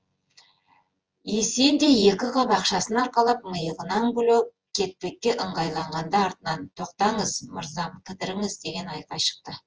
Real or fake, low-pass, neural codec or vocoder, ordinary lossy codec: fake; 7.2 kHz; vocoder, 24 kHz, 100 mel bands, Vocos; Opus, 24 kbps